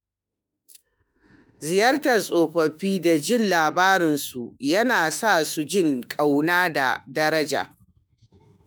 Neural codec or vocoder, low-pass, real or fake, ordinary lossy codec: autoencoder, 48 kHz, 32 numbers a frame, DAC-VAE, trained on Japanese speech; none; fake; none